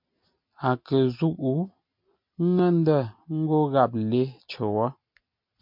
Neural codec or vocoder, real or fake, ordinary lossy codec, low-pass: none; real; MP3, 48 kbps; 5.4 kHz